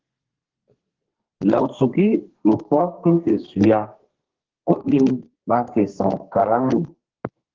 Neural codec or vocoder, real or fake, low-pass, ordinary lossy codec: codec, 32 kHz, 1.9 kbps, SNAC; fake; 7.2 kHz; Opus, 16 kbps